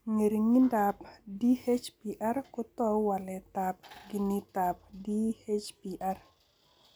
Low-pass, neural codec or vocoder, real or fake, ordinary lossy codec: none; none; real; none